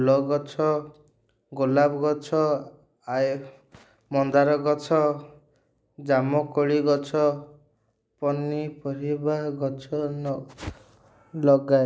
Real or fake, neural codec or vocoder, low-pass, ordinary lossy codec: real; none; none; none